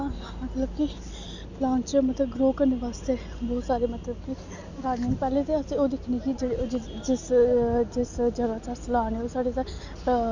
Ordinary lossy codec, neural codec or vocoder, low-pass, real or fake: none; none; 7.2 kHz; real